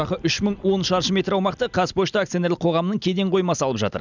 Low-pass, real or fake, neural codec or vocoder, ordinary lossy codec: 7.2 kHz; real; none; none